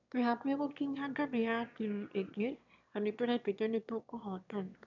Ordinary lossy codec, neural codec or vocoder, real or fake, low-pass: none; autoencoder, 22.05 kHz, a latent of 192 numbers a frame, VITS, trained on one speaker; fake; 7.2 kHz